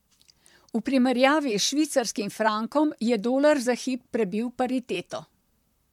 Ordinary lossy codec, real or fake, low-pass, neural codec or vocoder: MP3, 96 kbps; fake; 19.8 kHz; vocoder, 44.1 kHz, 128 mel bands, Pupu-Vocoder